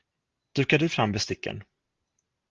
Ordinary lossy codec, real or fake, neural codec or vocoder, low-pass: Opus, 32 kbps; real; none; 7.2 kHz